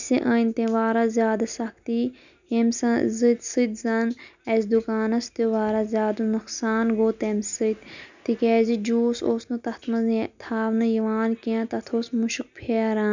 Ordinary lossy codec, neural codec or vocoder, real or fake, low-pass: none; none; real; 7.2 kHz